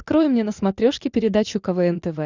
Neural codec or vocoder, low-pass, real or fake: none; 7.2 kHz; real